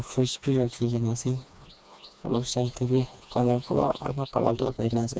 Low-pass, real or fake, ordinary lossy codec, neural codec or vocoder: none; fake; none; codec, 16 kHz, 2 kbps, FreqCodec, smaller model